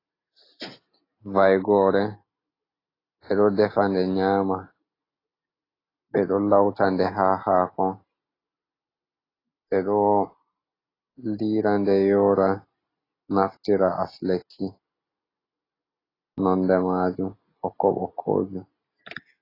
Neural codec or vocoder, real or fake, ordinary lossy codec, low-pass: none; real; AAC, 24 kbps; 5.4 kHz